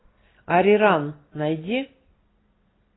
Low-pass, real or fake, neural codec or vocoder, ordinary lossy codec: 7.2 kHz; real; none; AAC, 16 kbps